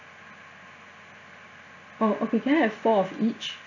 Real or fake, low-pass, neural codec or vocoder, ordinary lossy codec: real; 7.2 kHz; none; none